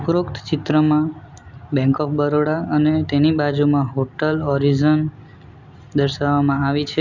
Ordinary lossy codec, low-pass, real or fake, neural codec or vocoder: Opus, 64 kbps; 7.2 kHz; real; none